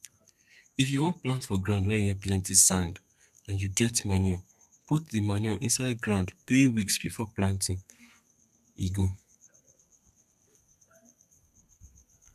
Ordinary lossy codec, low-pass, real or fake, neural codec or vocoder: none; 14.4 kHz; fake; codec, 32 kHz, 1.9 kbps, SNAC